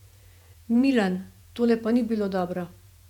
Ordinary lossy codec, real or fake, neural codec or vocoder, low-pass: none; fake; vocoder, 48 kHz, 128 mel bands, Vocos; 19.8 kHz